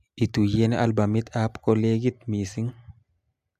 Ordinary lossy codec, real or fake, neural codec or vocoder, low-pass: AAC, 96 kbps; real; none; 14.4 kHz